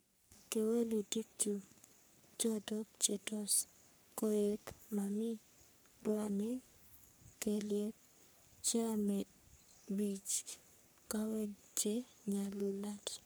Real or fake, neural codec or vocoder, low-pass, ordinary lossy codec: fake; codec, 44.1 kHz, 3.4 kbps, Pupu-Codec; none; none